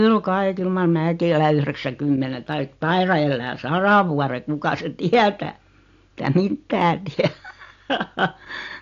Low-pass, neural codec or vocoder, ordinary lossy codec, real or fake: 7.2 kHz; none; AAC, 48 kbps; real